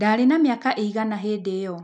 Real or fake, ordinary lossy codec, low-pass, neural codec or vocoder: real; none; 9.9 kHz; none